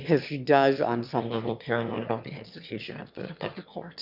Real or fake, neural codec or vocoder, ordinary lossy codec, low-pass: fake; autoencoder, 22.05 kHz, a latent of 192 numbers a frame, VITS, trained on one speaker; Opus, 64 kbps; 5.4 kHz